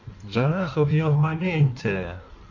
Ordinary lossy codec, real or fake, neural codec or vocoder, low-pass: none; fake; codec, 16 kHz in and 24 kHz out, 1.1 kbps, FireRedTTS-2 codec; 7.2 kHz